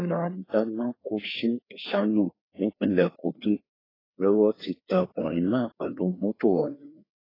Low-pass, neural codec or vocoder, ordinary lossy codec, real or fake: 5.4 kHz; codec, 16 kHz, 2 kbps, FreqCodec, larger model; AAC, 24 kbps; fake